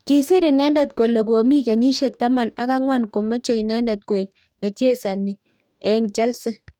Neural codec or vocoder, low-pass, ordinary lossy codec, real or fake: codec, 44.1 kHz, 2.6 kbps, DAC; 19.8 kHz; none; fake